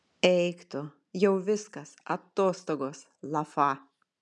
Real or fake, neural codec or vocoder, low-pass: real; none; 10.8 kHz